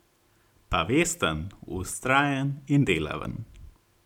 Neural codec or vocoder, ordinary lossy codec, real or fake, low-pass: none; none; real; 19.8 kHz